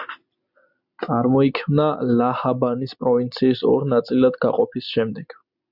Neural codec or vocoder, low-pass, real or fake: none; 5.4 kHz; real